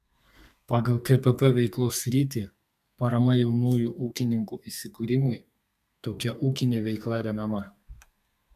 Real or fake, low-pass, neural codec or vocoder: fake; 14.4 kHz; codec, 32 kHz, 1.9 kbps, SNAC